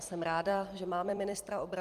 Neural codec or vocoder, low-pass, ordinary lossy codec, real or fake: vocoder, 44.1 kHz, 128 mel bands every 256 samples, BigVGAN v2; 14.4 kHz; Opus, 32 kbps; fake